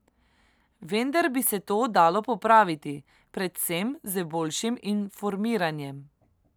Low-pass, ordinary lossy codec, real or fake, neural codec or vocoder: none; none; real; none